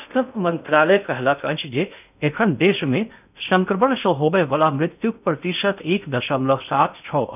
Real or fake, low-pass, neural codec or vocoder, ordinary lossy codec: fake; 3.6 kHz; codec, 16 kHz in and 24 kHz out, 0.6 kbps, FocalCodec, streaming, 2048 codes; none